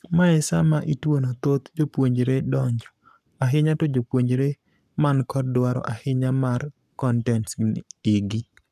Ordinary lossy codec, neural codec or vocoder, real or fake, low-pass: none; codec, 44.1 kHz, 7.8 kbps, Pupu-Codec; fake; 14.4 kHz